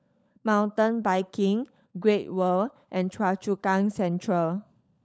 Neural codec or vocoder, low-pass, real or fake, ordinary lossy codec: codec, 16 kHz, 16 kbps, FunCodec, trained on LibriTTS, 50 frames a second; none; fake; none